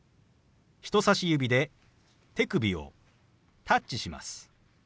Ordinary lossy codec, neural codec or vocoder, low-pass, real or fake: none; none; none; real